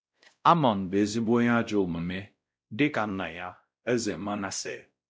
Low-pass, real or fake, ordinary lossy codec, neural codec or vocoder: none; fake; none; codec, 16 kHz, 0.5 kbps, X-Codec, WavLM features, trained on Multilingual LibriSpeech